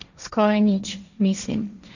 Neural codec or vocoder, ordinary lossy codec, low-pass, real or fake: codec, 16 kHz, 1.1 kbps, Voila-Tokenizer; none; 7.2 kHz; fake